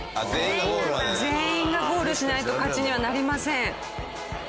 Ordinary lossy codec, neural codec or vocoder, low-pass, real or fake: none; none; none; real